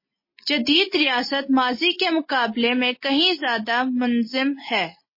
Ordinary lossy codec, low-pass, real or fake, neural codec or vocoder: MP3, 24 kbps; 5.4 kHz; real; none